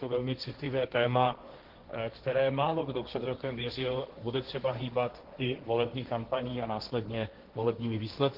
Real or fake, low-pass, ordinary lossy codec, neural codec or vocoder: fake; 5.4 kHz; Opus, 16 kbps; codec, 16 kHz, 1.1 kbps, Voila-Tokenizer